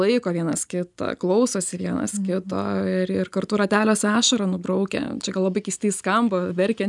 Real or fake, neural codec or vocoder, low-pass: real; none; 10.8 kHz